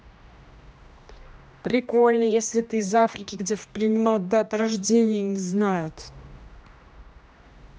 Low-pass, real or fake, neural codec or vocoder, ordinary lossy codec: none; fake; codec, 16 kHz, 1 kbps, X-Codec, HuBERT features, trained on general audio; none